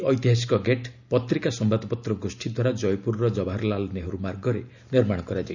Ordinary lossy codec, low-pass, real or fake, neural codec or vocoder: none; 7.2 kHz; real; none